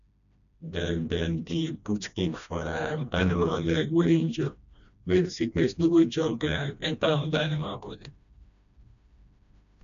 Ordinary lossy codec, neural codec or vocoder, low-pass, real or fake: none; codec, 16 kHz, 1 kbps, FreqCodec, smaller model; 7.2 kHz; fake